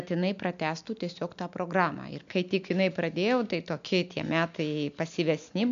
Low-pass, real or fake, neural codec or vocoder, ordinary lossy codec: 7.2 kHz; real; none; MP3, 64 kbps